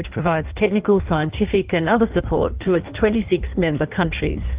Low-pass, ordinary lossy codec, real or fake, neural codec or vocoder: 3.6 kHz; Opus, 32 kbps; fake; codec, 16 kHz in and 24 kHz out, 1.1 kbps, FireRedTTS-2 codec